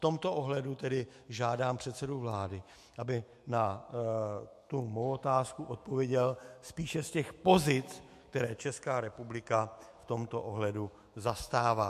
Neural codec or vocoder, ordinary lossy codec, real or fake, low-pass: autoencoder, 48 kHz, 128 numbers a frame, DAC-VAE, trained on Japanese speech; MP3, 64 kbps; fake; 14.4 kHz